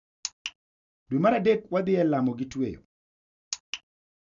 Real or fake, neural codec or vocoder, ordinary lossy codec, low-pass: real; none; none; 7.2 kHz